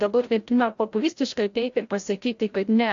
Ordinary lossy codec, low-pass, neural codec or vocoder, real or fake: AAC, 48 kbps; 7.2 kHz; codec, 16 kHz, 0.5 kbps, FreqCodec, larger model; fake